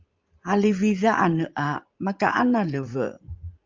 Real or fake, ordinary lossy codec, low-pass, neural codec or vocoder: fake; Opus, 32 kbps; 7.2 kHz; vocoder, 44.1 kHz, 80 mel bands, Vocos